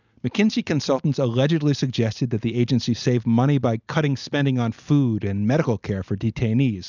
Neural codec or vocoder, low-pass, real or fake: none; 7.2 kHz; real